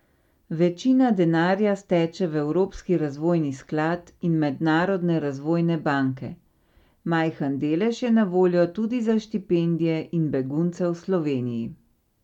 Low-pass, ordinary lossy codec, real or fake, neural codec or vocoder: 19.8 kHz; none; real; none